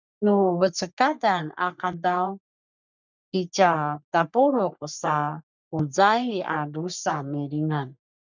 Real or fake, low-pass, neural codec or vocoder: fake; 7.2 kHz; codec, 44.1 kHz, 3.4 kbps, Pupu-Codec